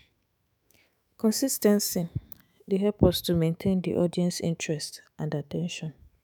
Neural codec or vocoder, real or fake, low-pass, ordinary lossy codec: autoencoder, 48 kHz, 128 numbers a frame, DAC-VAE, trained on Japanese speech; fake; none; none